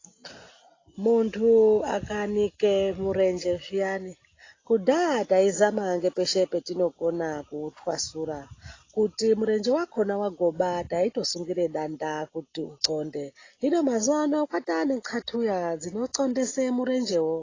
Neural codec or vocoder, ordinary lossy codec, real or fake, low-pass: none; AAC, 32 kbps; real; 7.2 kHz